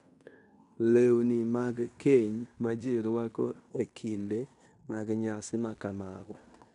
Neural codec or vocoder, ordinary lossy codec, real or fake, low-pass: codec, 16 kHz in and 24 kHz out, 0.9 kbps, LongCat-Audio-Codec, fine tuned four codebook decoder; MP3, 96 kbps; fake; 10.8 kHz